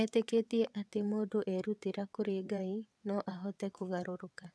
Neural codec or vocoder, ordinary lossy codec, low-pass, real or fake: vocoder, 22.05 kHz, 80 mel bands, WaveNeXt; none; none; fake